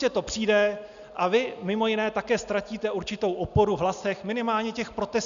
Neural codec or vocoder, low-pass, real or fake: none; 7.2 kHz; real